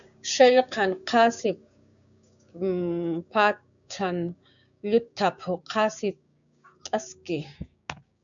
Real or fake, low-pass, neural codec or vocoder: fake; 7.2 kHz; codec, 16 kHz, 6 kbps, DAC